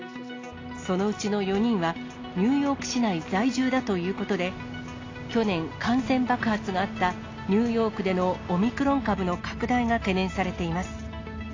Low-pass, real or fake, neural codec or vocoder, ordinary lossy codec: 7.2 kHz; real; none; AAC, 32 kbps